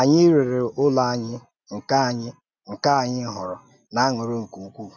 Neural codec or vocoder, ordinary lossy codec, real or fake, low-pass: none; none; real; 7.2 kHz